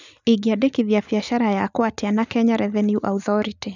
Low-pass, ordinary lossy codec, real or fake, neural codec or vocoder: 7.2 kHz; none; real; none